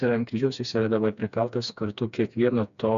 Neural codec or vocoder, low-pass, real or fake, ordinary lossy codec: codec, 16 kHz, 2 kbps, FreqCodec, smaller model; 7.2 kHz; fake; MP3, 96 kbps